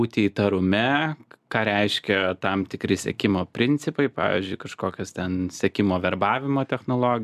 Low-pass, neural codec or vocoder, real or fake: 14.4 kHz; none; real